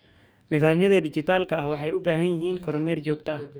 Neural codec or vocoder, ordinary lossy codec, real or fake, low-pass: codec, 44.1 kHz, 2.6 kbps, DAC; none; fake; none